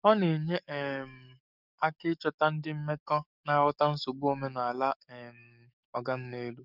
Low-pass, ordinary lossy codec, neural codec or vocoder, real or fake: 5.4 kHz; none; codec, 44.1 kHz, 7.8 kbps, DAC; fake